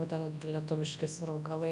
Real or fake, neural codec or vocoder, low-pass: fake; codec, 24 kHz, 0.9 kbps, WavTokenizer, large speech release; 10.8 kHz